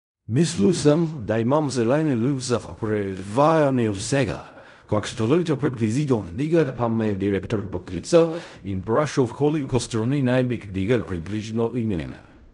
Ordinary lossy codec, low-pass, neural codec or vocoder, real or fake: none; 10.8 kHz; codec, 16 kHz in and 24 kHz out, 0.4 kbps, LongCat-Audio-Codec, fine tuned four codebook decoder; fake